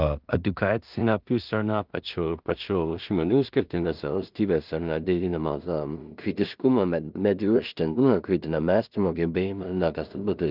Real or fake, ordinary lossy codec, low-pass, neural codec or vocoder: fake; Opus, 24 kbps; 5.4 kHz; codec, 16 kHz in and 24 kHz out, 0.4 kbps, LongCat-Audio-Codec, two codebook decoder